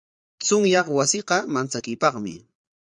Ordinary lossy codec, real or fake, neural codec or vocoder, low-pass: MP3, 96 kbps; fake; vocoder, 22.05 kHz, 80 mel bands, Vocos; 9.9 kHz